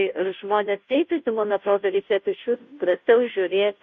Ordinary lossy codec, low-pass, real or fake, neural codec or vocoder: MP3, 48 kbps; 7.2 kHz; fake; codec, 16 kHz, 0.5 kbps, FunCodec, trained on Chinese and English, 25 frames a second